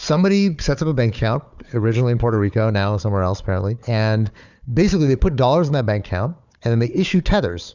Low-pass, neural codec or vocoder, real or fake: 7.2 kHz; codec, 16 kHz, 4 kbps, FunCodec, trained on Chinese and English, 50 frames a second; fake